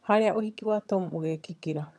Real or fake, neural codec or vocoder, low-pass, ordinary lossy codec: fake; vocoder, 22.05 kHz, 80 mel bands, HiFi-GAN; none; none